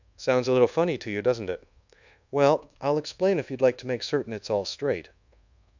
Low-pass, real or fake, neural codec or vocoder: 7.2 kHz; fake; codec, 24 kHz, 1.2 kbps, DualCodec